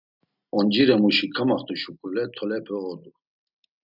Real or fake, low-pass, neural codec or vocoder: real; 5.4 kHz; none